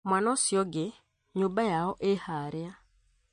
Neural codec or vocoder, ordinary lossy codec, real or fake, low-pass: none; MP3, 48 kbps; real; 9.9 kHz